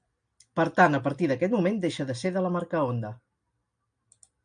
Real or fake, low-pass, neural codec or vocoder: real; 9.9 kHz; none